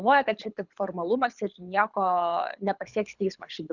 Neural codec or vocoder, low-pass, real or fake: codec, 24 kHz, 6 kbps, HILCodec; 7.2 kHz; fake